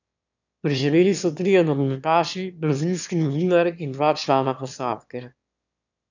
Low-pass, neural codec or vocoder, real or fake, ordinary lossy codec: 7.2 kHz; autoencoder, 22.05 kHz, a latent of 192 numbers a frame, VITS, trained on one speaker; fake; none